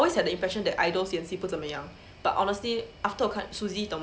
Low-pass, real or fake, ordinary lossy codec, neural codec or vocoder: none; real; none; none